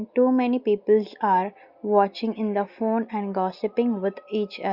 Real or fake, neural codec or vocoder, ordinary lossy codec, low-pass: real; none; Opus, 64 kbps; 5.4 kHz